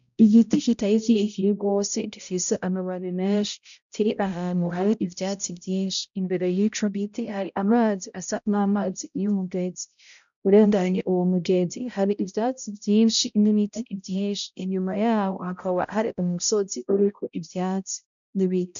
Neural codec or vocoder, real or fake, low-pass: codec, 16 kHz, 0.5 kbps, X-Codec, HuBERT features, trained on balanced general audio; fake; 7.2 kHz